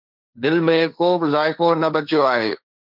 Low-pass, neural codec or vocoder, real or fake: 5.4 kHz; codec, 16 kHz, 1.1 kbps, Voila-Tokenizer; fake